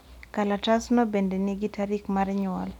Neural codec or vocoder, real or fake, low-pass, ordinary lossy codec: none; real; 19.8 kHz; none